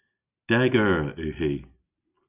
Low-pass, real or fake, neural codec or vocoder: 3.6 kHz; real; none